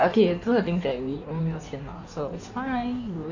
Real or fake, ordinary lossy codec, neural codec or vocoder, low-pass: fake; none; codec, 16 kHz in and 24 kHz out, 1.1 kbps, FireRedTTS-2 codec; 7.2 kHz